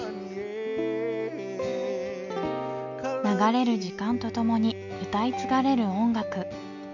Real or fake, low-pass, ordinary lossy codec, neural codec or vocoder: real; 7.2 kHz; none; none